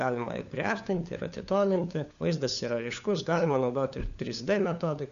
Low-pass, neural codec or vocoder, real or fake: 7.2 kHz; codec, 16 kHz, 4 kbps, FunCodec, trained on LibriTTS, 50 frames a second; fake